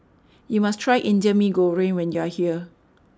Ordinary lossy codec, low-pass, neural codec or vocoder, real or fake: none; none; none; real